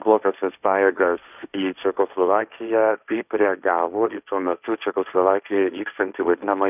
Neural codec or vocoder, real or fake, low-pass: codec, 16 kHz, 1.1 kbps, Voila-Tokenizer; fake; 3.6 kHz